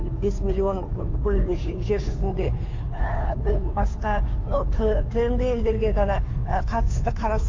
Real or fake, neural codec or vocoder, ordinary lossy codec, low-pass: fake; codec, 16 kHz, 2 kbps, FunCodec, trained on Chinese and English, 25 frames a second; MP3, 48 kbps; 7.2 kHz